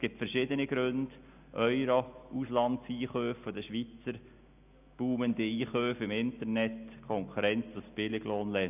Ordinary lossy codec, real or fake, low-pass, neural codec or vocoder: none; real; 3.6 kHz; none